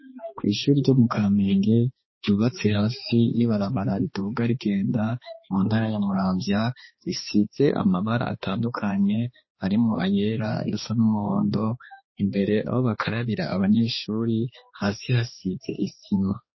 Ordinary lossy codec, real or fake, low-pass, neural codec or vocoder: MP3, 24 kbps; fake; 7.2 kHz; codec, 16 kHz, 2 kbps, X-Codec, HuBERT features, trained on balanced general audio